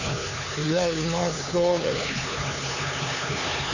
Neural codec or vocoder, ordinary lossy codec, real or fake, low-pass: codec, 16 kHz, 4 kbps, X-Codec, HuBERT features, trained on LibriSpeech; none; fake; 7.2 kHz